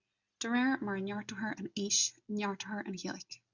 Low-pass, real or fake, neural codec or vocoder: 7.2 kHz; real; none